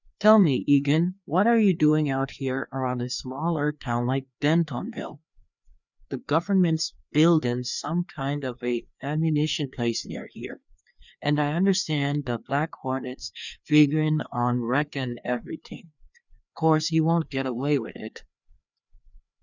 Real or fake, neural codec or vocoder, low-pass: fake; codec, 16 kHz, 2 kbps, FreqCodec, larger model; 7.2 kHz